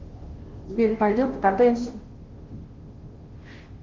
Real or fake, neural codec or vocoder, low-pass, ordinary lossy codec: fake; codec, 16 kHz, 0.5 kbps, FunCodec, trained on Chinese and English, 25 frames a second; 7.2 kHz; Opus, 16 kbps